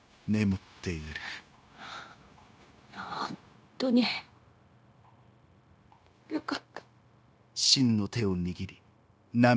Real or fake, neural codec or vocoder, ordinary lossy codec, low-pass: fake; codec, 16 kHz, 0.9 kbps, LongCat-Audio-Codec; none; none